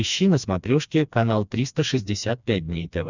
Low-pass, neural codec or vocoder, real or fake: 7.2 kHz; codec, 16 kHz, 2 kbps, FreqCodec, smaller model; fake